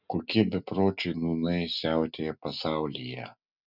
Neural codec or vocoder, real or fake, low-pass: none; real; 5.4 kHz